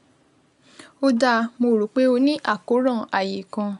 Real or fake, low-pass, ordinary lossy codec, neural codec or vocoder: real; 10.8 kHz; none; none